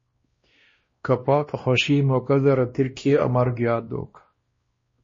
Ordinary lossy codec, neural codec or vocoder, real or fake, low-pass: MP3, 32 kbps; codec, 16 kHz, 1 kbps, X-Codec, WavLM features, trained on Multilingual LibriSpeech; fake; 7.2 kHz